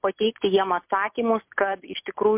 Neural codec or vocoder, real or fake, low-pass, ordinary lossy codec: none; real; 3.6 kHz; MP3, 32 kbps